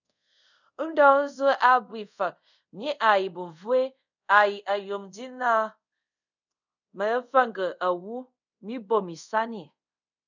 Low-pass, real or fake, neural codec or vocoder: 7.2 kHz; fake; codec, 24 kHz, 0.5 kbps, DualCodec